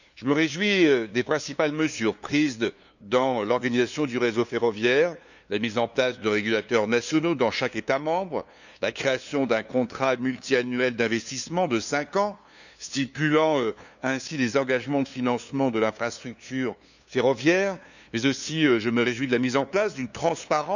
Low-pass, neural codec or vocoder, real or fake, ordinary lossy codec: 7.2 kHz; codec, 16 kHz, 2 kbps, FunCodec, trained on LibriTTS, 25 frames a second; fake; none